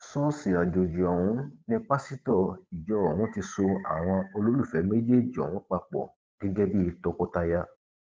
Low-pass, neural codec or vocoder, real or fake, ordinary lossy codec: none; codec, 16 kHz, 8 kbps, FunCodec, trained on Chinese and English, 25 frames a second; fake; none